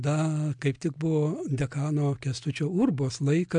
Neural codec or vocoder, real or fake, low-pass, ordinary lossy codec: none; real; 9.9 kHz; MP3, 64 kbps